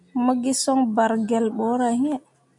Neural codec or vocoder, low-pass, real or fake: none; 10.8 kHz; real